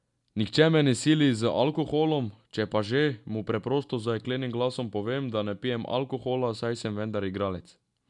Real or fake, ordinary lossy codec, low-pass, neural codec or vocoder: real; none; 10.8 kHz; none